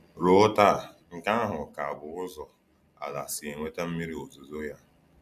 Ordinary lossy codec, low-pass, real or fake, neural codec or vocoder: none; 14.4 kHz; real; none